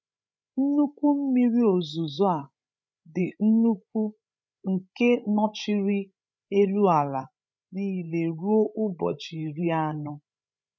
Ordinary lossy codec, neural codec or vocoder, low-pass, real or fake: none; codec, 16 kHz, 16 kbps, FreqCodec, larger model; none; fake